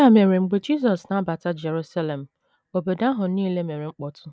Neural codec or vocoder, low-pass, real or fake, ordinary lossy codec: none; none; real; none